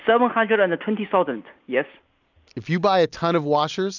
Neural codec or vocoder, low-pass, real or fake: none; 7.2 kHz; real